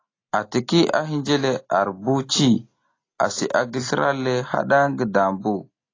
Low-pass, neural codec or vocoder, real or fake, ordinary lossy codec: 7.2 kHz; none; real; AAC, 32 kbps